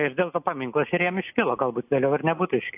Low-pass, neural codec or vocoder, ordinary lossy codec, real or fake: 3.6 kHz; none; AAC, 32 kbps; real